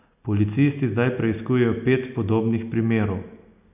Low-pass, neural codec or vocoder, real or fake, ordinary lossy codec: 3.6 kHz; none; real; none